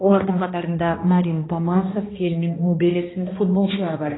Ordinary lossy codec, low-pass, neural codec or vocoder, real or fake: AAC, 16 kbps; 7.2 kHz; codec, 16 kHz, 1 kbps, X-Codec, HuBERT features, trained on balanced general audio; fake